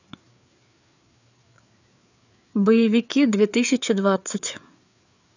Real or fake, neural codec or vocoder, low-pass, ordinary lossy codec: fake; codec, 16 kHz, 4 kbps, FreqCodec, larger model; 7.2 kHz; none